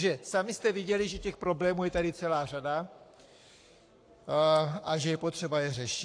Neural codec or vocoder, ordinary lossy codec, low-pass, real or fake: codec, 44.1 kHz, 7.8 kbps, Pupu-Codec; AAC, 48 kbps; 9.9 kHz; fake